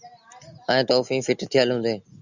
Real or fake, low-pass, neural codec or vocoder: real; 7.2 kHz; none